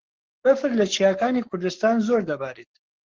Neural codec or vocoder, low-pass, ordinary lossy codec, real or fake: codec, 44.1 kHz, 7.8 kbps, Pupu-Codec; 7.2 kHz; Opus, 16 kbps; fake